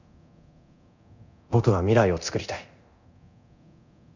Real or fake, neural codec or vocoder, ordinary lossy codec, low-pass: fake; codec, 24 kHz, 0.9 kbps, DualCodec; none; 7.2 kHz